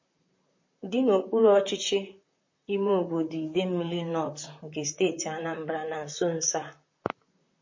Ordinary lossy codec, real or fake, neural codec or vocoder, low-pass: MP3, 32 kbps; fake; vocoder, 44.1 kHz, 128 mel bands, Pupu-Vocoder; 7.2 kHz